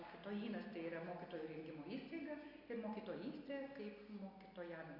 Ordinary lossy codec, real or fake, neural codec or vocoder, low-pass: Opus, 64 kbps; real; none; 5.4 kHz